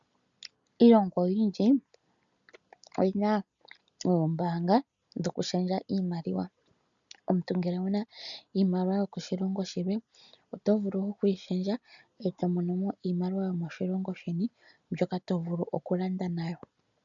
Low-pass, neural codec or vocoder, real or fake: 7.2 kHz; none; real